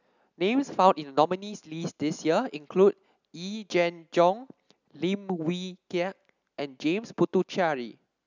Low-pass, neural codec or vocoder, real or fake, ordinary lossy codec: 7.2 kHz; none; real; none